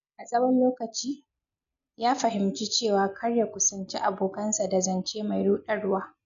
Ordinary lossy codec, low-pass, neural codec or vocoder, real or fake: none; 7.2 kHz; none; real